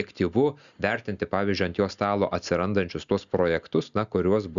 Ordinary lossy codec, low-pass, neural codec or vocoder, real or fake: Opus, 64 kbps; 7.2 kHz; none; real